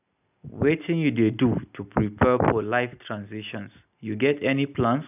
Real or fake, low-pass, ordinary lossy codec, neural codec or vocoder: real; 3.6 kHz; none; none